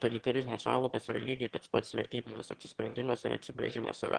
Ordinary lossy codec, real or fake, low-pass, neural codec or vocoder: Opus, 16 kbps; fake; 9.9 kHz; autoencoder, 22.05 kHz, a latent of 192 numbers a frame, VITS, trained on one speaker